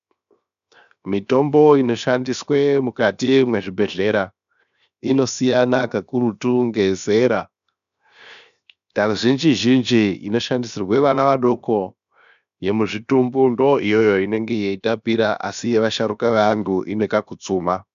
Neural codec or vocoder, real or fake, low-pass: codec, 16 kHz, 0.7 kbps, FocalCodec; fake; 7.2 kHz